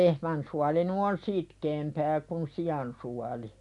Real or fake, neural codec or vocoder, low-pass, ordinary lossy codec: real; none; 10.8 kHz; none